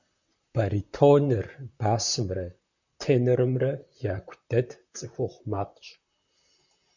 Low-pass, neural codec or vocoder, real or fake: 7.2 kHz; vocoder, 44.1 kHz, 128 mel bands, Pupu-Vocoder; fake